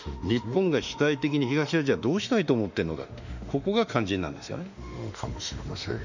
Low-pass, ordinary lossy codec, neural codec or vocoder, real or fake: 7.2 kHz; none; autoencoder, 48 kHz, 32 numbers a frame, DAC-VAE, trained on Japanese speech; fake